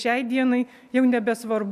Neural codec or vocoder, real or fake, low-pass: none; real; 14.4 kHz